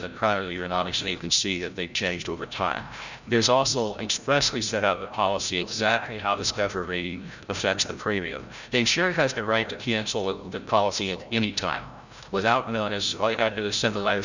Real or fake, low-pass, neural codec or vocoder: fake; 7.2 kHz; codec, 16 kHz, 0.5 kbps, FreqCodec, larger model